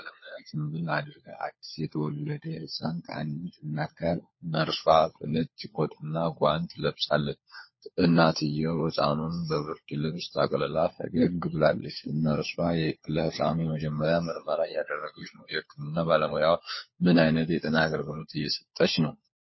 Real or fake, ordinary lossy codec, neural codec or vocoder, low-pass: fake; MP3, 24 kbps; codec, 16 kHz, 4 kbps, FunCodec, trained on LibriTTS, 50 frames a second; 7.2 kHz